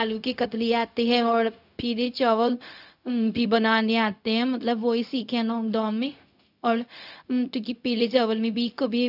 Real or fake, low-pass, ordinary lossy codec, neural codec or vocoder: fake; 5.4 kHz; none; codec, 16 kHz, 0.4 kbps, LongCat-Audio-Codec